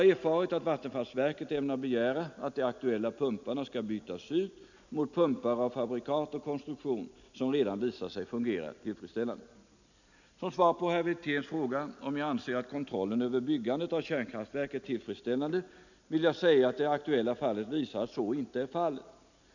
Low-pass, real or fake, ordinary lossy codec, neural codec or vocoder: 7.2 kHz; real; none; none